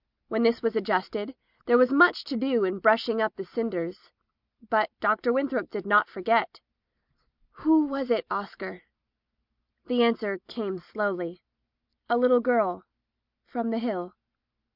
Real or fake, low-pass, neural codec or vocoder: real; 5.4 kHz; none